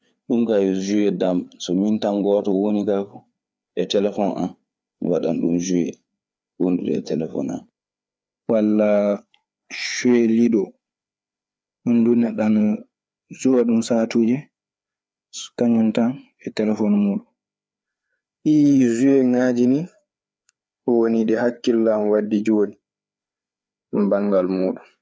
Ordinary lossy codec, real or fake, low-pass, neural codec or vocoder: none; fake; none; codec, 16 kHz, 4 kbps, FreqCodec, larger model